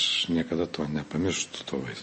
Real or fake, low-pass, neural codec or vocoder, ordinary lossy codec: real; 10.8 kHz; none; MP3, 32 kbps